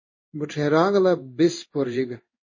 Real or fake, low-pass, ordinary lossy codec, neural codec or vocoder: fake; 7.2 kHz; MP3, 32 kbps; codec, 16 kHz in and 24 kHz out, 1 kbps, XY-Tokenizer